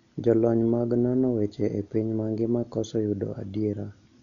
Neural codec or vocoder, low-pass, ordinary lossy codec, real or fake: none; 7.2 kHz; none; real